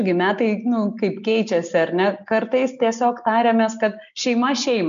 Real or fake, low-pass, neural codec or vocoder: real; 7.2 kHz; none